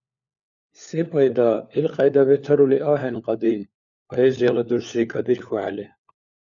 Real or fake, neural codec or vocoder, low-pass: fake; codec, 16 kHz, 4 kbps, FunCodec, trained on LibriTTS, 50 frames a second; 7.2 kHz